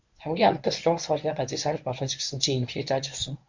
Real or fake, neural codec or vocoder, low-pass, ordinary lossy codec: fake; codec, 24 kHz, 0.9 kbps, WavTokenizer, medium speech release version 1; 7.2 kHz; MP3, 64 kbps